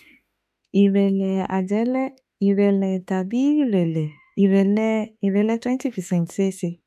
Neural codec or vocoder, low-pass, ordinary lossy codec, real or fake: autoencoder, 48 kHz, 32 numbers a frame, DAC-VAE, trained on Japanese speech; 14.4 kHz; none; fake